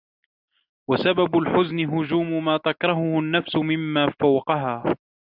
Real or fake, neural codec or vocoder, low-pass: real; none; 5.4 kHz